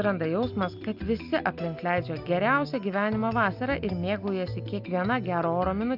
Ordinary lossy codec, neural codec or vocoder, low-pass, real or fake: Opus, 64 kbps; none; 5.4 kHz; real